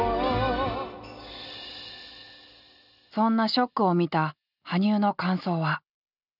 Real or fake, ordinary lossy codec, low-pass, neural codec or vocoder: real; none; 5.4 kHz; none